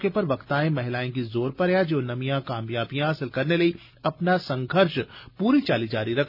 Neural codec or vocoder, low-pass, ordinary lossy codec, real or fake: none; 5.4 kHz; none; real